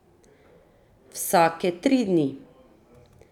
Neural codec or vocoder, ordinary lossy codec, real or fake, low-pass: none; none; real; 19.8 kHz